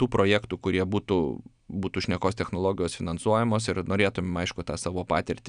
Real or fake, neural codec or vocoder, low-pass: real; none; 9.9 kHz